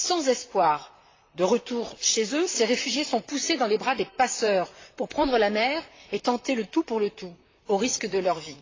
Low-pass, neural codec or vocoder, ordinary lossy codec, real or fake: 7.2 kHz; vocoder, 44.1 kHz, 128 mel bands, Pupu-Vocoder; AAC, 32 kbps; fake